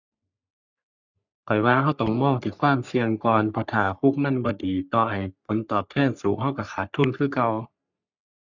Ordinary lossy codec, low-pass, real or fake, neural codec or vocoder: none; 7.2 kHz; fake; codec, 44.1 kHz, 3.4 kbps, Pupu-Codec